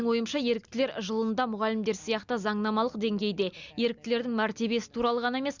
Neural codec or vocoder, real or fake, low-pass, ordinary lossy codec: none; real; 7.2 kHz; Opus, 64 kbps